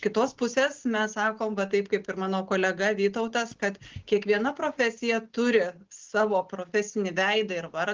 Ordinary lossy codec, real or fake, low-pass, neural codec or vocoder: Opus, 16 kbps; real; 7.2 kHz; none